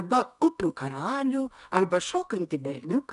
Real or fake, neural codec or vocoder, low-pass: fake; codec, 24 kHz, 0.9 kbps, WavTokenizer, medium music audio release; 10.8 kHz